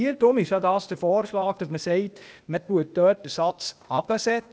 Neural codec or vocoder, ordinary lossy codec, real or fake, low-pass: codec, 16 kHz, 0.8 kbps, ZipCodec; none; fake; none